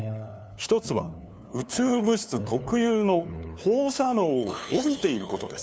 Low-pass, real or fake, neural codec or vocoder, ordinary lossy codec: none; fake; codec, 16 kHz, 2 kbps, FunCodec, trained on LibriTTS, 25 frames a second; none